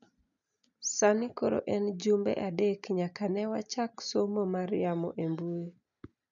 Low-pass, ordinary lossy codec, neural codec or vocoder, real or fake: 7.2 kHz; none; none; real